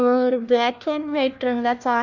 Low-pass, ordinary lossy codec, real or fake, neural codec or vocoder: 7.2 kHz; none; fake; codec, 16 kHz, 1 kbps, FunCodec, trained on LibriTTS, 50 frames a second